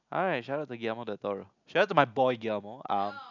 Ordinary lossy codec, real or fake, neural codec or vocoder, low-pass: none; real; none; 7.2 kHz